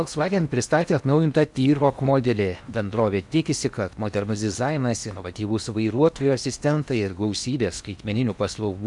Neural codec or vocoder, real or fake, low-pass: codec, 16 kHz in and 24 kHz out, 0.8 kbps, FocalCodec, streaming, 65536 codes; fake; 10.8 kHz